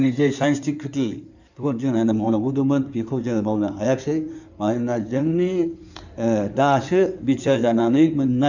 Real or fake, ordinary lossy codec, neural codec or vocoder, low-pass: fake; none; codec, 16 kHz in and 24 kHz out, 2.2 kbps, FireRedTTS-2 codec; 7.2 kHz